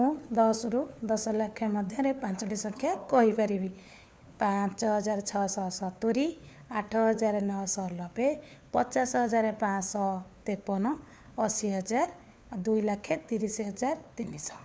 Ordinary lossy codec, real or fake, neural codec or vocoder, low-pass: none; fake; codec, 16 kHz, 4 kbps, FunCodec, trained on LibriTTS, 50 frames a second; none